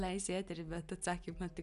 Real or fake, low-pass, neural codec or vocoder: real; 10.8 kHz; none